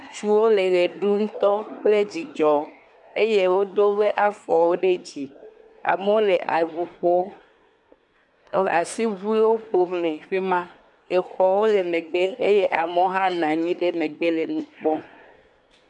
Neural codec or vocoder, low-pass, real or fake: codec, 24 kHz, 1 kbps, SNAC; 10.8 kHz; fake